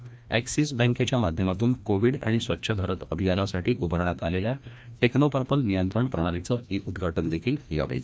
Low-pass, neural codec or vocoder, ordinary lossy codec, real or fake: none; codec, 16 kHz, 1 kbps, FreqCodec, larger model; none; fake